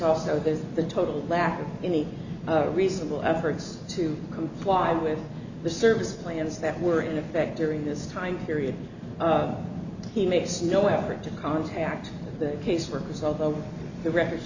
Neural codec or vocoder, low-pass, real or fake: none; 7.2 kHz; real